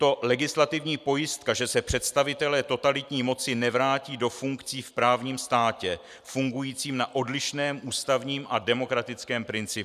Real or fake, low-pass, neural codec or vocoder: fake; 14.4 kHz; vocoder, 44.1 kHz, 128 mel bands every 512 samples, BigVGAN v2